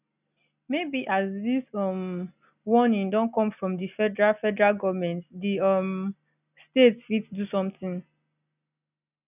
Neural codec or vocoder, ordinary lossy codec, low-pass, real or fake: none; none; 3.6 kHz; real